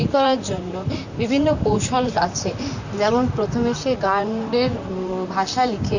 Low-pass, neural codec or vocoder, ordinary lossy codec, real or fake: 7.2 kHz; vocoder, 44.1 kHz, 128 mel bands, Pupu-Vocoder; none; fake